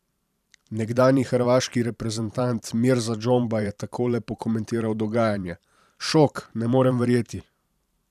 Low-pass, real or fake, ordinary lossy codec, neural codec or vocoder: 14.4 kHz; fake; none; vocoder, 44.1 kHz, 128 mel bands every 512 samples, BigVGAN v2